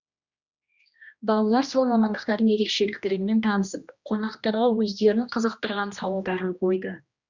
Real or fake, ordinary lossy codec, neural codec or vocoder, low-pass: fake; Opus, 64 kbps; codec, 16 kHz, 1 kbps, X-Codec, HuBERT features, trained on general audio; 7.2 kHz